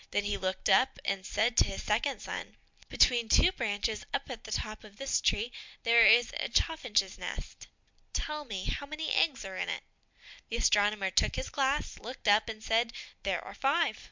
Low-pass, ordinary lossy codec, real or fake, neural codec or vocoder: 7.2 kHz; MP3, 64 kbps; real; none